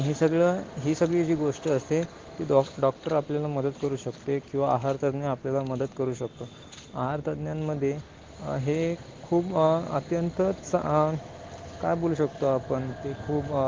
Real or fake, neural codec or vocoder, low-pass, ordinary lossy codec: real; none; 7.2 kHz; Opus, 16 kbps